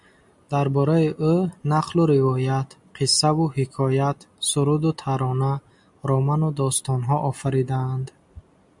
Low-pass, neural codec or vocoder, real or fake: 10.8 kHz; none; real